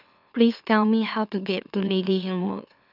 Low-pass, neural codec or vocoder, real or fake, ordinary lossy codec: 5.4 kHz; autoencoder, 44.1 kHz, a latent of 192 numbers a frame, MeloTTS; fake; none